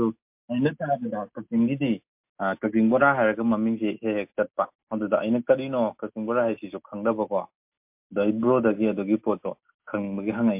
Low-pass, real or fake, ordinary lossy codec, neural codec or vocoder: 3.6 kHz; real; MP3, 32 kbps; none